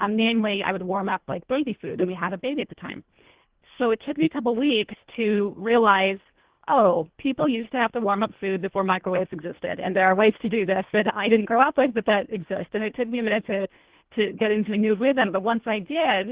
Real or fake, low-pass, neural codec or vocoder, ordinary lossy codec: fake; 3.6 kHz; codec, 24 kHz, 1.5 kbps, HILCodec; Opus, 16 kbps